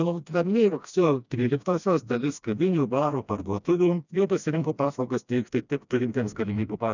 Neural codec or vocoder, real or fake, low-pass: codec, 16 kHz, 1 kbps, FreqCodec, smaller model; fake; 7.2 kHz